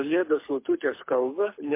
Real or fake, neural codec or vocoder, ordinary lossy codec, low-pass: fake; codec, 24 kHz, 6 kbps, HILCodec; MP3, 24 kbps; 3.6 kHz